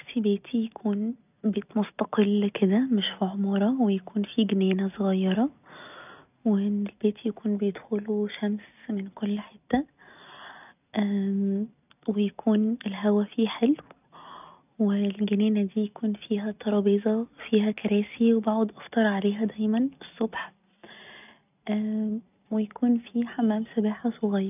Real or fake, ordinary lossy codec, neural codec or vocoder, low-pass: real; none; none; 3.6 kHz